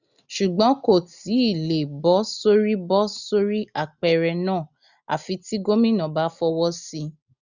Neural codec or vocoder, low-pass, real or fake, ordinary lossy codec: none; 7.2 kHz; real; none